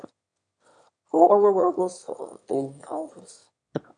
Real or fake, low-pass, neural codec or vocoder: fake; 9.9 kHz; autoencoder, 22.05 kHz, a latent of 192 numbers a frame, VITS, trained on one speaker